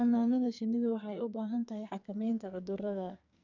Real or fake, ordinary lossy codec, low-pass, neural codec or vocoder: fake; none; 7.2 kHz; codec, 44.1 kHz, 2.6 kbps, SNAC